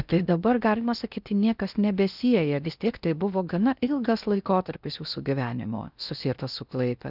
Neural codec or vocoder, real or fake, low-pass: codec, 16 kHz in and 24 kHz out, 0.6 kbps, FocalCodec, streaming, 4096 codes; fake; 5.4 kHz